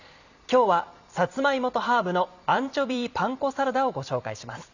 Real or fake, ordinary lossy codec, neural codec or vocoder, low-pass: fake; none; vocoder, 44.1 kHz, 128 mel bands every 256 samples, BigVGAN v2; 7.2 kHz